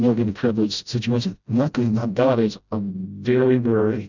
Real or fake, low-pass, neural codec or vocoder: fake; 7.2 kHz; codec, 16 kHz, 0.5 kbps, FreqCodec, smaller model